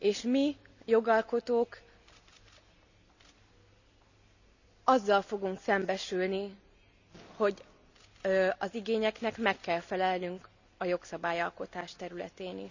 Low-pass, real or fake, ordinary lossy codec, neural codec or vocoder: 7.2 kHz; real; none; none